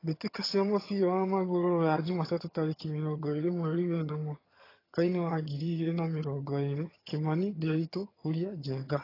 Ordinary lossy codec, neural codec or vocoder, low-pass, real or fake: AAC, 24 kbps; vocoder, 22.05 kHz, 80 mel bands, HiFi-GAN; 5.4 kHz; fake